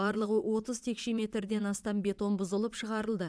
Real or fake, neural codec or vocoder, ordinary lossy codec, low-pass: fake; vocoder, 22.05 kHz, 80 mel bands, Vocos; none; none